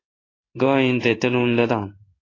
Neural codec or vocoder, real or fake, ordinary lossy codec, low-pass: codec, 16 kHz in and 24 kHz out, 1 kbps, XY-Tokenizer; fake; AAC, 32 kbps; 7.2 kHz